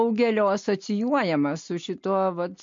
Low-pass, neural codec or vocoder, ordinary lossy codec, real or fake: 7.2 kHz; none; MP3, 48 kbps; real